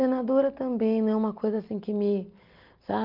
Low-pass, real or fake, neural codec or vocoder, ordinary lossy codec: 5.4 kHz; real; none; Opus, 32 kbps